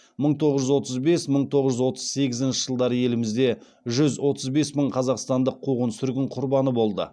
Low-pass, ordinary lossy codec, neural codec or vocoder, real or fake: none; none; none; real